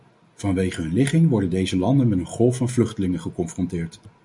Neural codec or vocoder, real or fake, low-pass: none; real; 10.8 kHz